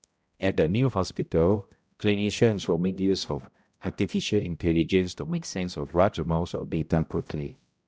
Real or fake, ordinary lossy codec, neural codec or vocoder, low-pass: fake; none; codec, 16 kHz, 0.5 kbps, X-Codec, HuBERT features, trained on balanced general audio; none